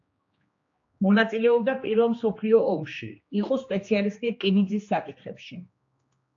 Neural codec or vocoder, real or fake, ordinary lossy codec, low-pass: codec, 16 kHz, 2 kbps, X-Codec, HuBERT features, trained on general audio; fake; AAC, 48 kbps; 7.2 kHz